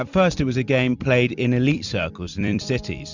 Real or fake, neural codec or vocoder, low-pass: fake; vocoder, 44.1 kHz, 128 mel bands every 256 samples, BigVGAN v2; 7.2 kHz